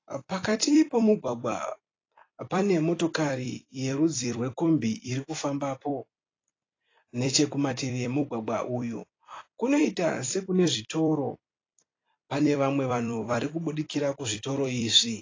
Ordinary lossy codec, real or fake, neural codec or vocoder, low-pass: AAC, 32 kbps; fake; vocoder, 44.1 kHz, 128 mel bands every 256 samples, BigVGAN v2; 7.2 kHz